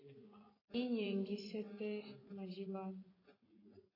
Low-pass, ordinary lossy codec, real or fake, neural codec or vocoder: 5.4 kHz; AAC, 24 kbps; fake; vocoder, 24 kHz, 100 mel bands, Vocos